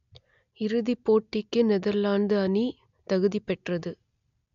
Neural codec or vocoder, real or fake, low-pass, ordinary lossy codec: none; real; 7.2 kHz; AAC, 96 kbps